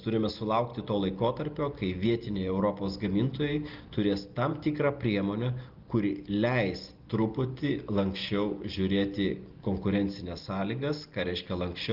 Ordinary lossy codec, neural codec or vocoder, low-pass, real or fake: Opus, 24 kbps; none; 5.4 kHz; real